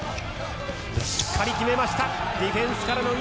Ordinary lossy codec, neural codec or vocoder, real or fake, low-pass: none; none; real; none